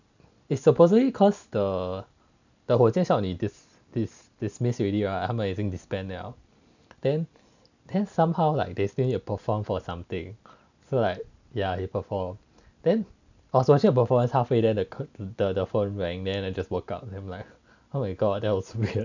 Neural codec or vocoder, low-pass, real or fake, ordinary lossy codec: none; 7.2 kHz; real; none